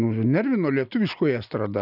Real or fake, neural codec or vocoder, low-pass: real; none; 5.4 kHz